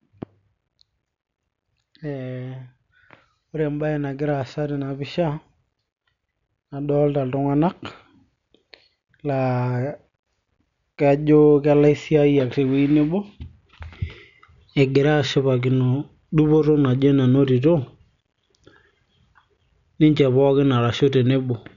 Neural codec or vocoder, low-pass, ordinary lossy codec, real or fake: none; 7.2 kHz; none; real